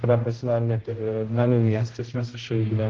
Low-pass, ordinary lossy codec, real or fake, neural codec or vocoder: 7.2 kHz; Opus, 16 kbps; fake; codec, 16 kHz, 0.5 kbps, X-Codec, HuBERT features, trained on general audio